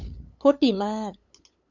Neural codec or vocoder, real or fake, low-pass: codec, 16 kHz, 8 kbps, FunCodec, trained on LibriTTS, 25 frames a second; fake; 7.2 kHz